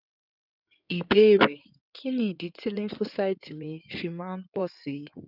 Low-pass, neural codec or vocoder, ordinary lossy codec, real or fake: 5.4 kHz; codec, 16 kHz in and 24 kHz out, 2.2 kbps, FireRedTTS-2 codec; none; fake